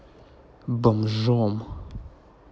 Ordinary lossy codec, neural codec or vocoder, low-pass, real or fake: none; none; none; real